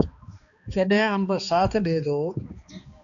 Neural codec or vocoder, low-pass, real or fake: codec, 16 kHz, 2 kbps, X-Codec, HuBERT features, trained on balanced general audio; 7.2 kHz; fake